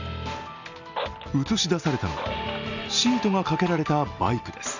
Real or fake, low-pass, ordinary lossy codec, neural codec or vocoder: real; 7.2 kHz; none; none